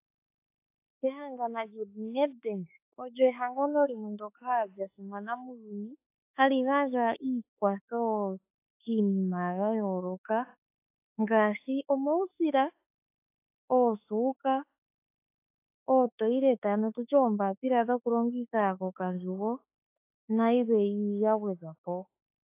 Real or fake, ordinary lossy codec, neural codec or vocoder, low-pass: fake; AAC, 24 kbps; autoencoder, 48 kHz, 32 numbers a frame, DAC-VAE, trained on Japanese speech; 3.6 kHz